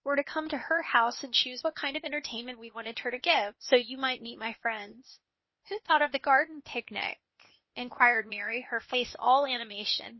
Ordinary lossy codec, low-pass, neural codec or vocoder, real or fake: MP3, 24 kbps; 7.2 kHz; codec, 16 kHz, 0.8 kbps, ZipCodec; fake